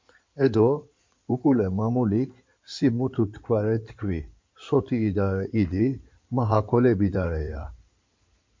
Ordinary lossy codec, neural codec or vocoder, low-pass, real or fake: MP3, 48 kbps; codec, 16 kHz in and 24 kHz out, 2.2 kbps, FireRedTTS-2 codec; 7.2 kHz; fake